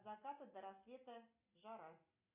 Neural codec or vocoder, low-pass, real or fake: none; 3.6 kHz; real